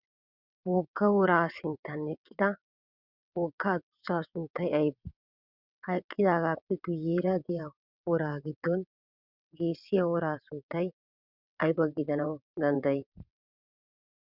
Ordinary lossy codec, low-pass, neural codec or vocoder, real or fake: Opus, 64 kbps; 5.4 kHz; vocoder, 22.05 kHz, 80 mel bands, WaveNeXt; fake